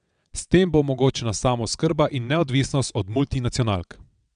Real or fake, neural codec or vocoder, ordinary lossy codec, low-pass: fake; vocoder, 22.05 kHz, 80 mel bands, WaveNeXt; none; 9.9 kHz